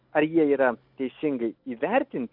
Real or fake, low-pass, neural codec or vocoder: real; 5.4 kHz; none